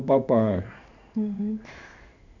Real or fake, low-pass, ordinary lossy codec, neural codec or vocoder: fake; 7.2 kHz; none; codec, 16 kHz in and 24 kHz out, 1 kbps, XY-Tokenizer